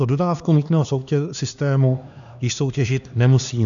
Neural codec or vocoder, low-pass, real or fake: codec, 16 kHz, 2 kbps, X-Codec, HuBERT features, trained on LibriSpeech; 7.2 kHz; fake